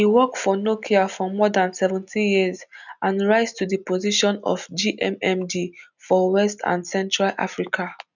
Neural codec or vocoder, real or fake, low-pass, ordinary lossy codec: none; real; 7.2 kHz; none